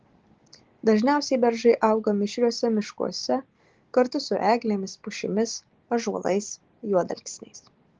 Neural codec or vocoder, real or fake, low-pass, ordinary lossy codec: none; real; 7.2 kHz; Opus, 16 kbps